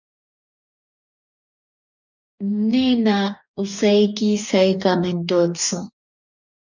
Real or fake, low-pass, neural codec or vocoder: fake; 7.2 kHz; codec, 44.1 kHz, 2.6 kbps, DAC